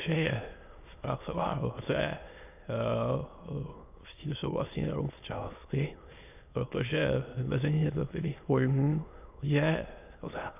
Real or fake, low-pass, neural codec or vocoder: fake; 3.6 kHz; autoencoder, 22.05 kHz, a latent of 192 numbers a frame, VITS, trained on many speakers